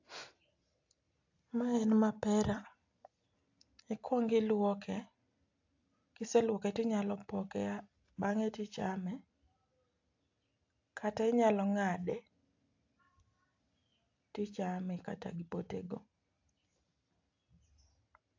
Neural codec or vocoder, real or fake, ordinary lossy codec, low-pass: none; real; AAC, 48 kbps; 7.2 kHz